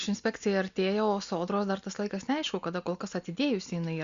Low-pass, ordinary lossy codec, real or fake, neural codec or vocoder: 7.2 kHz; Opus, 64 kbps; real; none